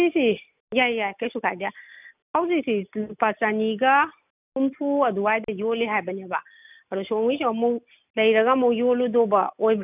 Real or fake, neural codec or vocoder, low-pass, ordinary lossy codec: real; none; 3.6 kHz; none